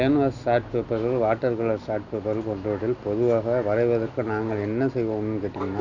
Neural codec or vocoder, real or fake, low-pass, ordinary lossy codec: none; real; 7.2 kHz; none